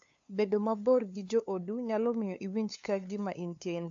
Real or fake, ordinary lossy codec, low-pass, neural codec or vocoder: fake; AAC, 64 kbps; 7.2 kHz; codec, 16 kHz, 2 kbps, FunCodec, trained on LibriTTS, 25 frames a second